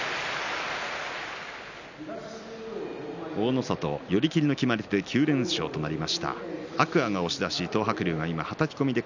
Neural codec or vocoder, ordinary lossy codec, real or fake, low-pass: none; none; real; 7.2 kHz